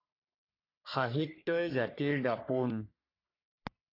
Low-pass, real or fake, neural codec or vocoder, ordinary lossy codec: 5.4 kHz; fake; codec, 44.1 kHz, 3.4 kbps, Pupu-Codec; AAC, 32 kbps